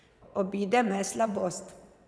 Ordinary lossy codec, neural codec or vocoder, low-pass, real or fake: none; vocoder, 22.05 kHz, 80 mel bands, WaveNeXt; none; fake